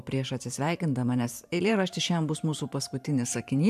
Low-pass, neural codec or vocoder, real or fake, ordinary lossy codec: 14.4 kHz; vocoder, 44.1 kHz, 128 mel bands every 512 samples, BigVGAN v2; fake; AAC, 96 kbps